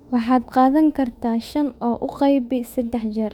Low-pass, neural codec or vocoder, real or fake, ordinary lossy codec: 19.8 kHz; autoencoder, 48 kHz, 32 numbers a frame, DAC-VAE, trained on Japanese speech; fake; none